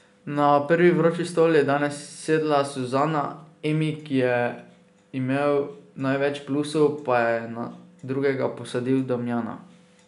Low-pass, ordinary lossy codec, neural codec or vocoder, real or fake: 10.8 kHz; none; none; real